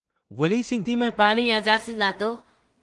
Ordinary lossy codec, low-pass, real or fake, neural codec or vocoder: Opus, 24 kbps; 10.8 kHz; fake; codec, 16 kHz in and 24 kHz out, 0.4 kbps, LongCat-Audio-Codec, two codebook decoder